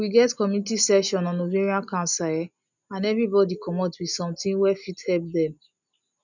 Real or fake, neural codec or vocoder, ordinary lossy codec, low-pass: real; none; none; 7.2 kHz